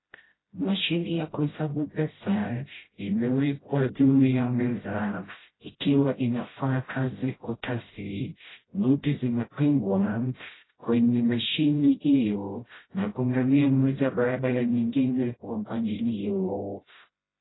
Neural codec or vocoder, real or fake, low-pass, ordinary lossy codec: codec, 16 kHz, 0.5 kbps, FreqCodec, smaller model; fake; 7.2 kHz; AAC, 16 kbps